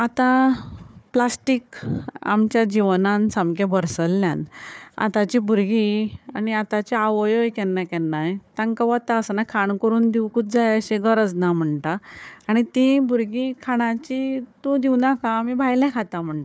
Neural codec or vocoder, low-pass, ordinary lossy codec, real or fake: codec, 16 kHz, 4 kbps, FunCodec, trained on Chinese and English, 50 frames a second; none; none; fake